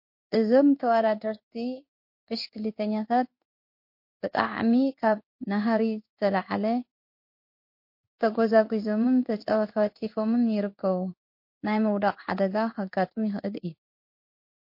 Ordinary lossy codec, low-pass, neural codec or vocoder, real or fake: MP3, 32 kbps; 5.4 kHz; codec, 16 kHz in and 24 kHz out, 1 kbps, XY-Tokenizer; fake